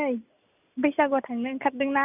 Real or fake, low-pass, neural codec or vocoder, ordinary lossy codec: real; 3.6 kHz; none; none